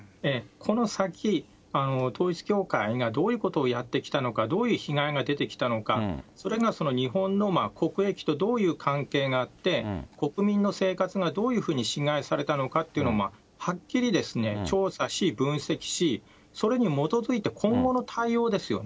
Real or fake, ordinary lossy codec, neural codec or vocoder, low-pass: real; none; none; none